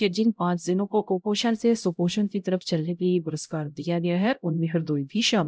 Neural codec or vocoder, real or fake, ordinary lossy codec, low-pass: codec, 16 kHz, 0.5 kbps, X-Codec, HuBERT features, trained on LibriSpeech; fake; none; none